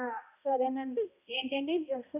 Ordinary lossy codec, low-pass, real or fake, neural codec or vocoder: none; 3.6 kHz; fake; codec, 16 kHz, 0.5 kbps, X-Codec, HuBERT features, trained on balanced general audio